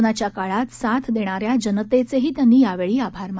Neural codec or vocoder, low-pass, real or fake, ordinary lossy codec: none; none; real; none